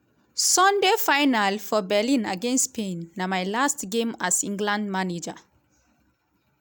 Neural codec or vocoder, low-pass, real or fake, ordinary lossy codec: none; none; real; none